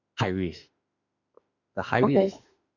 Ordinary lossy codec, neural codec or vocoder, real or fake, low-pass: none; autoencoder, 48 kHz, 32 numbers a frame, DAC-VAE, trained on Japanese speech; fake; 7.2 kHz